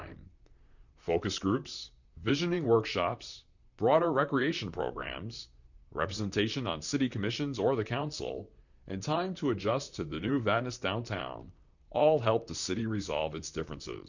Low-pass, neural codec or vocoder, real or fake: 7.2 kHz; vocoder, 44.1 kHz, 128 mel bands, Pupu-Vocoder; fake